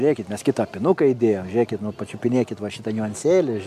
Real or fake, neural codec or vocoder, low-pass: real; none; 14.4 kHz